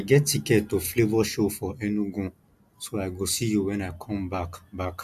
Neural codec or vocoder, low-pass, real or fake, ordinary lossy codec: none; 14.4 kHz; real; none